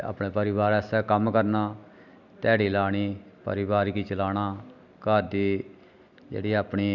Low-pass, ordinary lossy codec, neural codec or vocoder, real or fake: 7.2 kHz; none; none; real